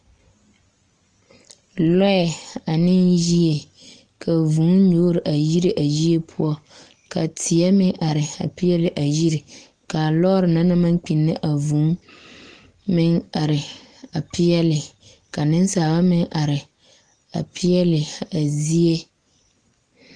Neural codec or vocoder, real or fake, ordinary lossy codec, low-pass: none; real; Opus, 24 kbps; 9.9 kHz